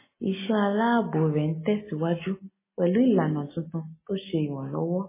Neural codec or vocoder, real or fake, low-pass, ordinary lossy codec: none; real; 3.6 kHz; MP3, 16 kbps